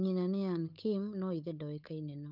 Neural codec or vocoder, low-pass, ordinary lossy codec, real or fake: codec, 16 kHz, 8 kbps, FunCodec, trained on Chinese and English, 25 frames a second; 5.4 kHz; none; fake